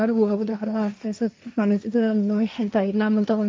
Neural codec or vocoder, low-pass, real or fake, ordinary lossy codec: codec, 16 kHz, 1.1 kbps, Voila-Tokenizer; none; fake; none